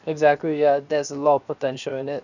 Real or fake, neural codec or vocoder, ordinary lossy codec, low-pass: fake; codec, 16 kHz, 0.7 kbps, FocalCodec; none; 7.2 kHz